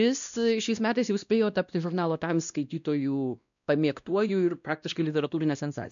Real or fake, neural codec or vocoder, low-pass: fake; codec, 16 kHz, 1 kbps, X-Codec, WavLM features, trained on Multilingual LibriSpeech; 7.2 kHz